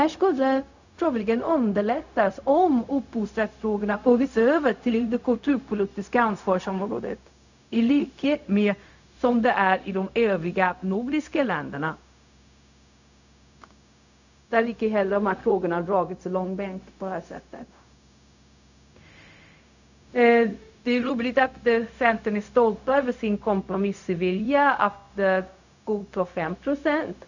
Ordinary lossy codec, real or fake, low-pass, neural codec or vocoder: none; fake; 7.2 kHz; codec, 16 kHz, 0.4 kbps, LongCat-Audio-Codec